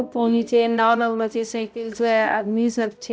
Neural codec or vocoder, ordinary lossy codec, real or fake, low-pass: codec, 16 kHz, 0.5 kbps, X-Codec, HuBERT features, trained on balanced general audio; none; fake; none